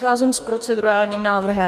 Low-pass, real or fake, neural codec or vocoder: 14.4 kHz; fake; codec, 44.1 kHz, 2.6 kbps, DAC